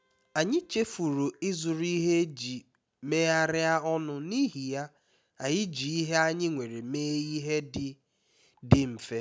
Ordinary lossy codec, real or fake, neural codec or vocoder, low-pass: none; real; none; none